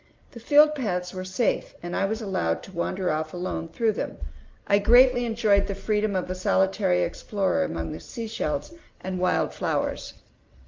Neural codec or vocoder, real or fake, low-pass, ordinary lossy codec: none; real; 7.2 kHz; Opus, 32 kbps